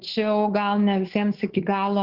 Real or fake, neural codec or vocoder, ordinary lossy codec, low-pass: fake; codec, 24 kHz, 3.1 kbps, DualCodec; Opus, 16 kbps; 5.4 kHz